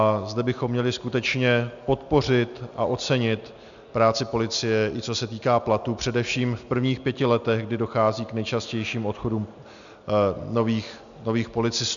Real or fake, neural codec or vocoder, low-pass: real; none; 7.2 kHz